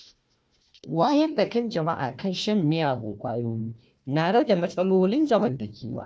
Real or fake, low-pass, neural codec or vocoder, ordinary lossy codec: fake; none; codec, 16 kHz, 1 kbps, FunCodec, trained on Chinese and English, 50 frames a second; none